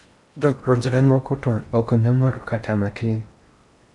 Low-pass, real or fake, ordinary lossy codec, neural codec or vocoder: 10.8 kHz; fake; MP3, 96 kbps; codec, 16 kHz in and 24 kHz out, 0.6 kbps, FocalCodec, streaming, 4096 codes